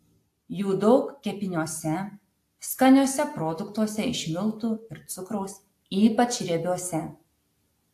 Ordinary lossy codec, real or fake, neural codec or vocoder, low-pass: AAC, 64 kbps; real; none; 14.4 kHz